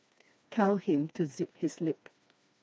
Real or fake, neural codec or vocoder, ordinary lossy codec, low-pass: fake; codec, 16 kHz, 2 kbps, FreqCodec, smaller model; none; none